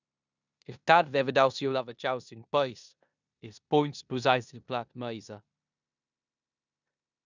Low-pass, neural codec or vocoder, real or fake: 7.2 kHz; codec, 16 kHz in and 24 kHz out, 0.9 kbps, LongCat-Audio-Codec, four codebook decoder; fake